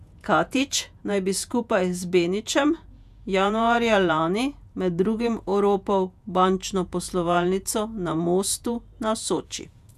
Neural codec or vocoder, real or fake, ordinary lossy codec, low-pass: vocoder, 48 kHz, 128 mel bands, Vocos; fake; none; 14.4 kHz